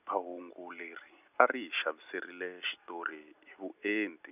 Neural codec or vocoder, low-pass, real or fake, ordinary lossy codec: none; 3.6 kHz; real; none